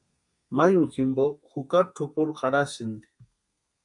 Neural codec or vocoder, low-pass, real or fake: codec, 32 kHz, 1.9 kbps, SNAC; 10.8 kHz; fake